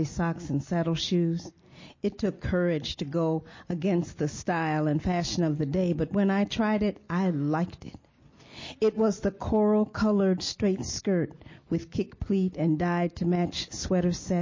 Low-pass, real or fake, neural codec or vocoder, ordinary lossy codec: 7.2 kHz; real; none; MP3, 32 kbps